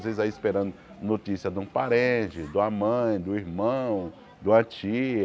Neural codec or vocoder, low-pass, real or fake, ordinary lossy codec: none; none; real; none